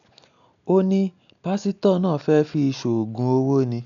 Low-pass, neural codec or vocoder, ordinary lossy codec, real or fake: 7.2 kHz; none; none; real